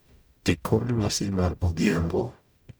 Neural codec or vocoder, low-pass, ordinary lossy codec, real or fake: codec, 44.1 kHz, 0.9 kbps, DAC; none; none; fake